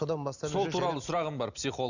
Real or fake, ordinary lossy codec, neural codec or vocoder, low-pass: real; none; none; 7.2 kHz